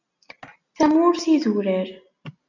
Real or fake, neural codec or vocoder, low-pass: real; none; 7.2 kHz